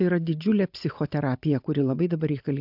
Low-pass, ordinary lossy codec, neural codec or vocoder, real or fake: 5.4 kHz; AAC, 48 kbps; none; real